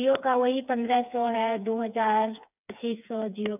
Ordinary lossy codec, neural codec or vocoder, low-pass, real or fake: none; codec, 16 kHz, 4 kbps, FreqCodec, smaller model; 3.6 kHz; fake